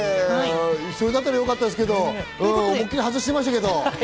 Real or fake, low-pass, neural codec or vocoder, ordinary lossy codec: real; none; none; none